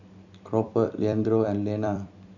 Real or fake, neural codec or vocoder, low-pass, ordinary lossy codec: fake; vocoder, 44.1 kHz, 128 mel bands every 256 samples, BigVGAN v2; 7.2 kHz; none